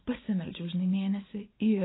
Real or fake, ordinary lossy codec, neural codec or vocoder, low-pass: fake; AAC, 16 kbps; vocoder, 44.1 kHz, 80 mel bands, Vocos; 7.2 kHz